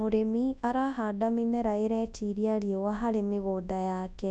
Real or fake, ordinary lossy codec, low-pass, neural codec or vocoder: fake; none; 10.8 kHz; codec, 24 kHz, 0.9 kbps, WavTokenizer, large speech release